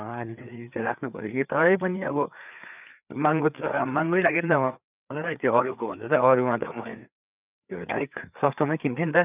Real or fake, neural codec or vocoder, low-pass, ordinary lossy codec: fake; codec, 16 kHz, 4 kbps, FreqCodec, larger model; 3.6 kHz; none